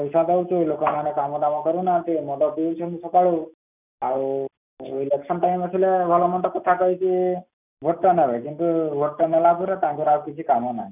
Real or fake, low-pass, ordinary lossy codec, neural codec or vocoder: real; 3.6 kHz; none; none